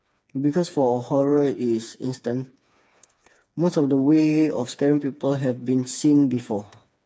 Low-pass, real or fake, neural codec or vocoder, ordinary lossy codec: none; fake; codec, 16 kHz, 4 kbps, FreqCodec, smaller model; none